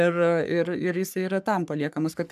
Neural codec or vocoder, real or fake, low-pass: codec, 44.1 kHz, 3.4 kbps, Pupu-Codec; fake; 14.4 kHz